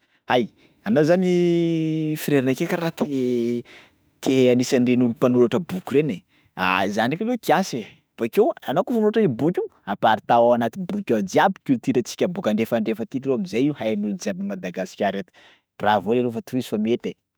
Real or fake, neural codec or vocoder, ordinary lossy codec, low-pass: fake; autoencoder, 48 kHz, 32 numbers a frame, DAC-VAE, trained on Japanese speech; none; none